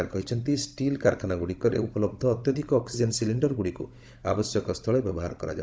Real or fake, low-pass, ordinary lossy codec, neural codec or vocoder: fake; none; none; codec, 16 kHz, 16 kbps, FunCodec, trained on Chinese and English, 50 frames a second